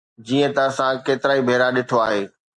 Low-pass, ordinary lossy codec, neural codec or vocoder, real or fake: 10.8 kHz; MP3, 96 kbps; none; real